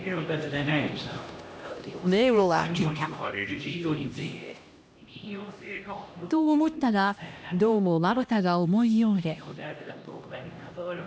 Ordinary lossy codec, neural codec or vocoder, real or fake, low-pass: none; codec, 16 kHz, 1 kbps, X-Codec, HuBERT features, trained on LibriSpeech; fake; none